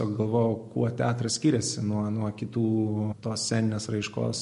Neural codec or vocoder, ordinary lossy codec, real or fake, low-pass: none; MP3, 48 kbps; real; 10.8 kHz